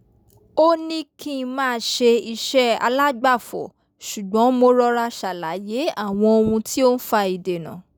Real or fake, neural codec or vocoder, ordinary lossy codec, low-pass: real; none; none; none